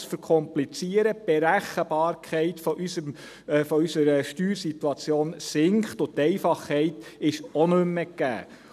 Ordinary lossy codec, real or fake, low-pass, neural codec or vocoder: none; real; 14.4 kHz; none